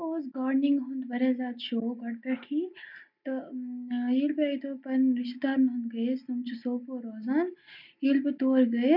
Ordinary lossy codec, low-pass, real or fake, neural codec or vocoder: none; 5.4 kHz; real; none